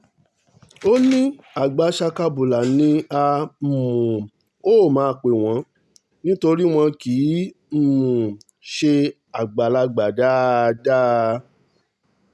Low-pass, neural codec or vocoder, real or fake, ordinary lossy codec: none; none; real; none